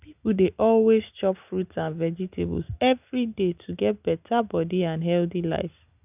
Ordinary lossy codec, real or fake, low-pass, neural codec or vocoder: none; real; 3.6 kHz; none